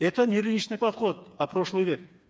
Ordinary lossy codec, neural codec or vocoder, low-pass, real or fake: none; codec, 16 kHz, 4 kbps, FreqCodec, smaller model; none; fake